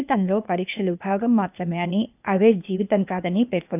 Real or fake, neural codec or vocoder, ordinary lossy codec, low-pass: fake; codec, 16 kHz, 0.8 kbps, ZipCodec; none; 3.6 kHz